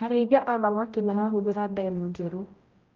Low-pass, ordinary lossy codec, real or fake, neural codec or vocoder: 7.2 kHz; Opus, 24 kbps; fake; codec, 16 kHz, 0.5 kbps, X-Codec, HuBERT features, trained on general audio